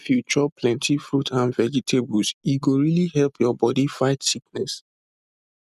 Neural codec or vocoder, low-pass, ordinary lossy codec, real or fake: none; 14.4 kHz; none; real